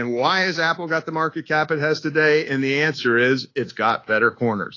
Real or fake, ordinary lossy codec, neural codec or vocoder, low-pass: fake; AAC, 32 kbps; codec, 24 kHz, 1.2 kbps, DualCodec; 7.2 kHz